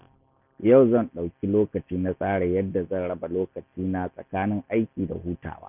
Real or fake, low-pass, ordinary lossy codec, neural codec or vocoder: real; 3.6 kHz; none; none